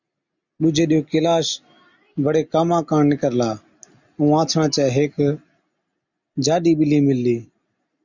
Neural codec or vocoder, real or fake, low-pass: none; real; 7.2 kHz